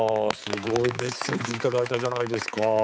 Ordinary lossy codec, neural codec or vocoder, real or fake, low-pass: none; codec, 16 kHz, 4 kbps, X-Codec, HuBERT features, trained on balanced general audio; fake; none